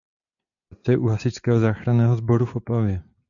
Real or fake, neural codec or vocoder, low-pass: real; none; 7.2 kHz